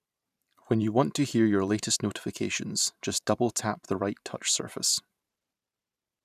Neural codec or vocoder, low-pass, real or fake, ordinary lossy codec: vocoder, 44.1 kHz, 128 mel bands every 512 samples, BigVGAN v2; 14.4 kHz; fake; none